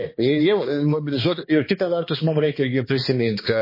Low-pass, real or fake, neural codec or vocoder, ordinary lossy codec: 5.4 kHz; fake; codec, 16 kHz, 2 kbps, X-Codec, HuBERT features, trained on balanced general audio; MP3, 24 kbps